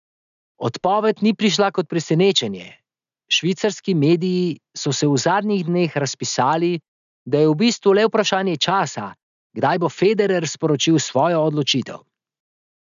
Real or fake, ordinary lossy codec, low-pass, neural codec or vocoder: real; none; 7.2 kHz; none